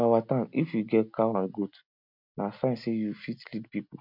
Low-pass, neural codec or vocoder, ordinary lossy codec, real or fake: 5.4 kHz; none; MP3, 48 kbps; real